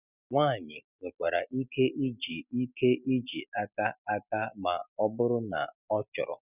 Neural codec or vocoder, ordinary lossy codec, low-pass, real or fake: none; none; 3.6 kHz; real